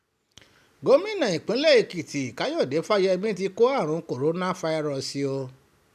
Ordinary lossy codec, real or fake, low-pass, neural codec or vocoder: none; real; 14.4 kHz; none